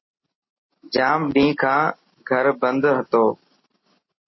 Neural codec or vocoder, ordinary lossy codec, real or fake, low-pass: none; MP3, 24 kbps; real; 7.2 kHz